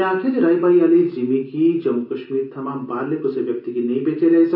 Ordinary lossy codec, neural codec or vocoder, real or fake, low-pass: none; none; real; 5.4 kHz